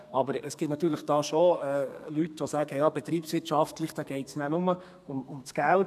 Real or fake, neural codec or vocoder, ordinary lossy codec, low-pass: fake; codec, 32 kHz, 1.9 kbps, SNAC; none; 14.4 kHz